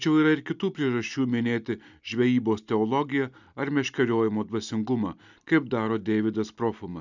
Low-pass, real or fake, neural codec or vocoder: 7.2 kHz; real; none